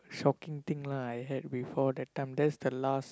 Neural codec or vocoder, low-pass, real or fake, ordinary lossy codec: none; none; real; none